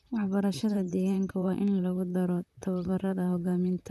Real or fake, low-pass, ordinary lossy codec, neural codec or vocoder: fake; 14.4 kHz; none; vocoder, 44.1 kHz, 128 mel bands, Pupu-Vocoder